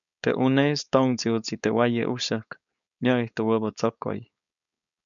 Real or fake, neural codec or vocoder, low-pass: fake; codec, 16 kHz, 4.8 kbps, FACodec; 7.2 kHz